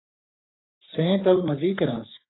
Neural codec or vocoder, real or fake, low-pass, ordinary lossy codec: codec, 44.1 kHz, 3.4 kbps, Pupu-Codec; fake; 7.2 kHz; AAC, 16 kbps